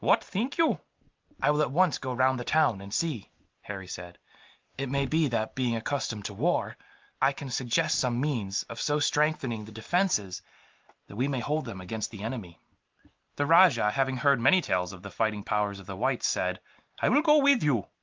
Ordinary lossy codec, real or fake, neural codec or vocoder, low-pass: Opus, 32 kbps; real; none; 7.2 kHz